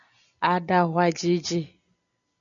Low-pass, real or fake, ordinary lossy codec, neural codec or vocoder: 7.2 kHz; real; MP3, 96 kbps; none